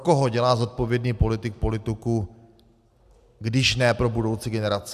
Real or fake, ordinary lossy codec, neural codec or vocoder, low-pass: real; AAC, 96 kbps; none; 14.4 kHz